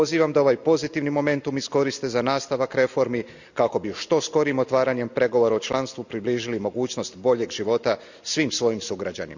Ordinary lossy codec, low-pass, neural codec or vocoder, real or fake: none; 7.2 kHz; none; real